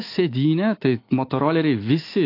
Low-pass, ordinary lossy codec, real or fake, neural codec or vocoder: 5.4 kHz; AAC, 32 kbps; fake; autoencoder, 48 kHz, 128 numbers a frame, DAC-VAE, trained on Japanese speech